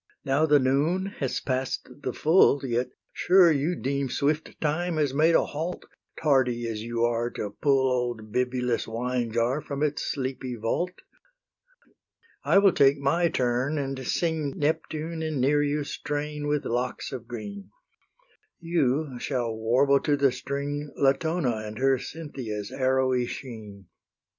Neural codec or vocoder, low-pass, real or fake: none; 7.2 kHz; real